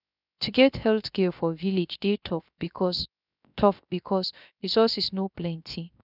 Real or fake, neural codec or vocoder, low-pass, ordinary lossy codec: fake; codec, 16 kHz, 0.3 kbps, FocalCodec; 5.4 kHz; none